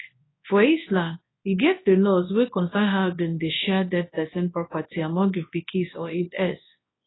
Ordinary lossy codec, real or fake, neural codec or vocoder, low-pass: AAC, 16 kbps; fake; codec, 24 kHz, 0.9 kbps, WavTokenizer, large speech release; 7.2 kHz